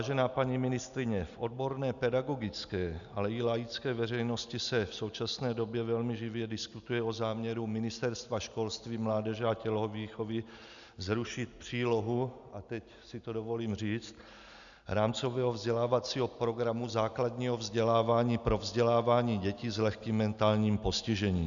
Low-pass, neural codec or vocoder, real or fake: 7.2 kHz; none; real